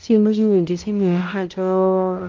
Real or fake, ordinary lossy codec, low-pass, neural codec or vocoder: fake; Opus, 32 kbps; 7.2 kHz; codec, 16 kHz, 0.5 kbps, X-Codec, HuBERT features, trained on balanced general audio